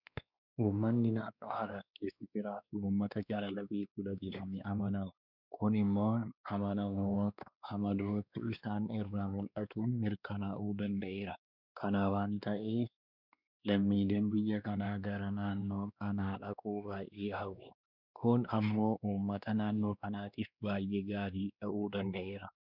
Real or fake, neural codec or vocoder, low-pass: fake; codec, 16 kHz, 2 kbps, X-Codec, WavLM features, trained on Multilingual LibriSpeech; 5.4 kHz